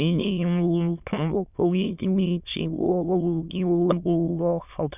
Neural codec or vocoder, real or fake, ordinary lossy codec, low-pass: autoencoder, 22.05 kHz, a latent of 192 numbers a frame, VITS, trained on many speakers; fake; none; 3.6 kHz